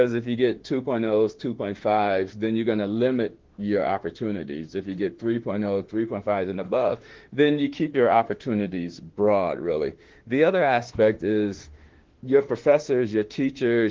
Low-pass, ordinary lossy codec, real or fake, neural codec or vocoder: 7.2 kHz; Opus, 16 kbps; fake; autoencoder, 48 kHz, 32 numbers a frame, DAC-VAE, trained on Japanese speech